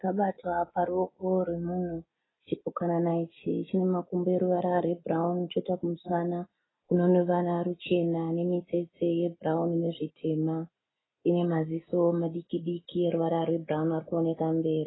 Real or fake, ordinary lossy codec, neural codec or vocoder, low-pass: real; AAC, 16 kbps; none; 7.2 kHz